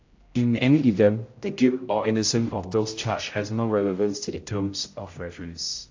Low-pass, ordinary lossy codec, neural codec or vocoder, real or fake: 7.2 kHz; AAC, 48 kbps; codec, 16 kHz, 0.5 kbps, X-Codec, HuBERT features, trained on general audio; fake